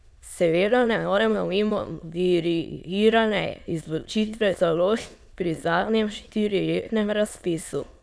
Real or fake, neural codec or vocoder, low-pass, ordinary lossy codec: fake; autoencoder, 22.05 kHz, a latent of 192 numbers a frame, VITS, trained on many speakers; none; none